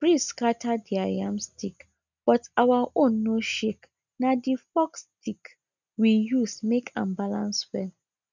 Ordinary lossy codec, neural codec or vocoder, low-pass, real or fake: none; none; 7.2 kHz; real